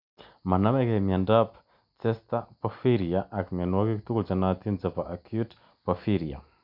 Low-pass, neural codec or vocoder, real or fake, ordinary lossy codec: 5.4 kHz; none; real; none